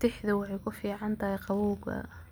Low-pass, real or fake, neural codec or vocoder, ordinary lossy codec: none; real; none; none